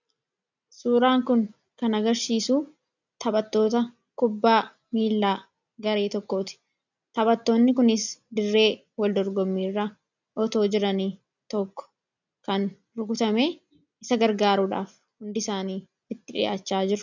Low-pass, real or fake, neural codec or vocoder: 7.2 kHz; real; none